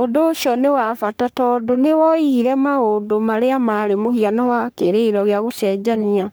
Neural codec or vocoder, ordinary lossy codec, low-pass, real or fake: codec, 44.1 kHz, 3.4 kbps, Pupu-Codec; none; none; fake